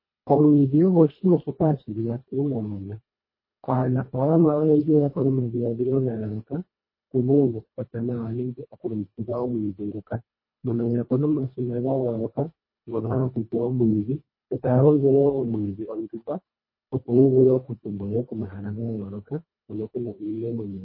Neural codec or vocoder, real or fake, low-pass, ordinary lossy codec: codec, 24 kHz, 1.5 kbps, HILCodec; fake; 5.4 kHz; MP3, 24 kbps